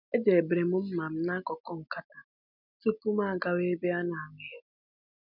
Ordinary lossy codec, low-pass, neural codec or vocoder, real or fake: none; 5.4 kHz; none; real